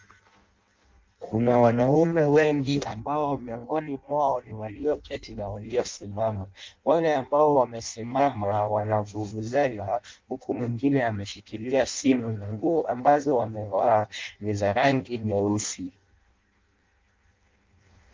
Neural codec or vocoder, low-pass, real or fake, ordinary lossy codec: codec, 16 kHz in and 24 kHz out, 0.6 kbps, FireRedTTS-2 codec; 7.2 kHz; fake; Opus, 24 kbps